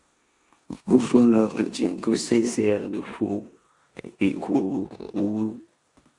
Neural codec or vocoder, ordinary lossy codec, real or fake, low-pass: codec, 16 kHz in and 24 kHz out, 0.9 kbps, LongCat-Audio-Codec, four codebook decoder; Opus, 64 kbps; fake; 10.8 kHz